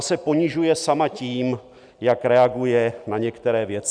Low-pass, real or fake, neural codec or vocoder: 9.9 kHz; real; none